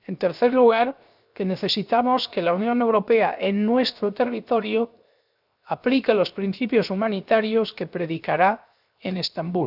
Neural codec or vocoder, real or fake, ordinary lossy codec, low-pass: codec, 16 kHz, 0.7 kbps, FocalCodec; fake; none; 5.4 kHz